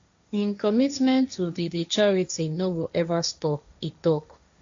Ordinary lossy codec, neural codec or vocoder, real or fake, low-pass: AAC, 64 kbps; codec, 16 kHz, 1.1 kbps, Voila-Tokenizer; fake; 7.2 kHz